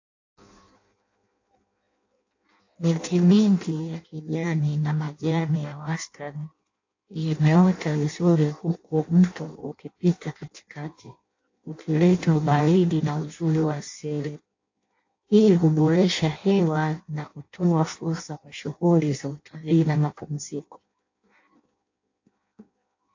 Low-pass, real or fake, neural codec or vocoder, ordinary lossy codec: 7.2 kHz; fake; codec, 16 kHz in and 24 kHz out, 0.6 kbps, FireRedTTS-2 codec; AAC, 48 kbps